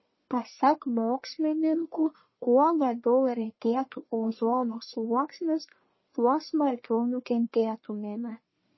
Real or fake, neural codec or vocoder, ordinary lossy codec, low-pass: fake; codec, 44.1 kHz, 1.7 kbps, Pupu-Codec; MP3, 24 kbps; 7.2 kHz